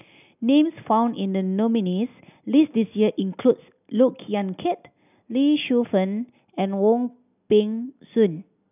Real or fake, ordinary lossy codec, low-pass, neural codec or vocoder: real; none; 3.6 kHz; none